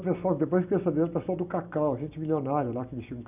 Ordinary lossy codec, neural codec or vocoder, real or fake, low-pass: none; none; real; 3.6 kHz